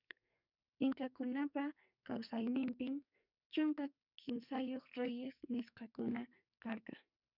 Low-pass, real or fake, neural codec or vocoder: 5.4 kHz; fake; codec, 44.1 kHz, 2.6 kbps, SNAC